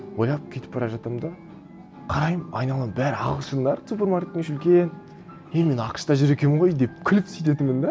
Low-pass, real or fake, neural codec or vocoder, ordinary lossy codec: none; real; none; none